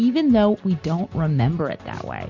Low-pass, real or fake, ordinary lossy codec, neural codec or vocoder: 7.2 kHz; real; MP3, 48 kbps; none